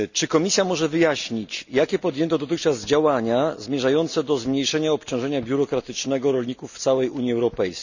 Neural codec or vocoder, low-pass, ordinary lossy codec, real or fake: none; 7.2 kHz; none; real